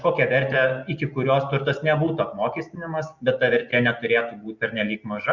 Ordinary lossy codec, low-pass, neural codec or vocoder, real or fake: Opus, 64 kbps; 7.2 kHz; none; real